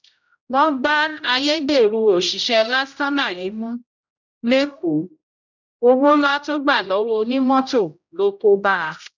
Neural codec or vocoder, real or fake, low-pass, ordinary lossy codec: codec, 16 kHz, 0.5 kbps, X-Codec, HuBERT features, trained on general audio; fake; 7.2 kHz; none